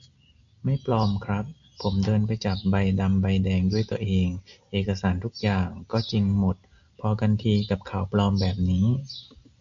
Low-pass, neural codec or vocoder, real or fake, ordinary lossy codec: 7.2 kHz; none; real; MP3, 64 kbps